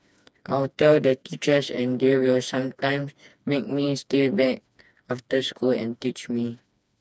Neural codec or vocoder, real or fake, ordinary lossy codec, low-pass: codec, 16 kHz, 2 kbps, FreqCodec, smaller model; fake; none; none